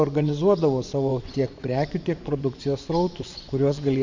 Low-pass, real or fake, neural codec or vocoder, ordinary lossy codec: 7.2 kHz; fake; vocoder, 24 kHz, 100 mel bands, Vocos; MP3, 64 kbps